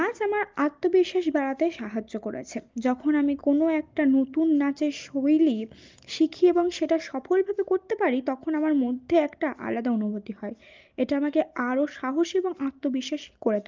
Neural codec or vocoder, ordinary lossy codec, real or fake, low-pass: none; Opus, 32 kbps; real; 7.2 kHz